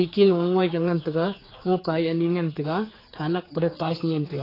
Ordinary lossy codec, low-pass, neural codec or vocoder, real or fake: AAC, 24 kbps; 5.4 kHz; codec, 16 kHz, 4 kbps, X-Codec, HuBERT features, trained on general audio; fake